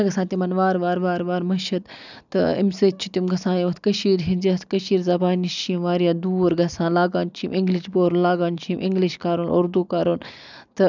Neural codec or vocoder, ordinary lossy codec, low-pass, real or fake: none; none; 7.2 kHz; real